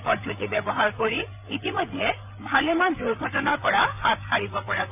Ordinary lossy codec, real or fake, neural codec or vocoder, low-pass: none; fake; codec, 16 kHz, 8 kbps, FreqCodec, larger model; 3.6 kHz